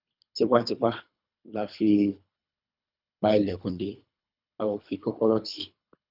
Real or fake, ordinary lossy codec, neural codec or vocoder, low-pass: fake; none; codec, 24 kHz, 3 kbps, HILCodec; 5.4 kHz